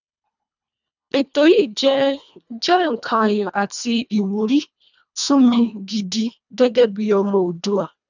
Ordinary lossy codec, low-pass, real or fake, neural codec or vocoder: none; 7.2 kHz; fake; codec, 24 kHz, 1.5 kbps, HILCodec